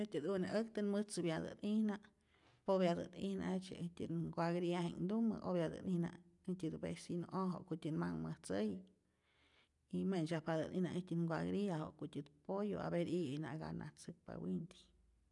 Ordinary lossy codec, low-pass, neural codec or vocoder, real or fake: none; 10.8 kHz; none; real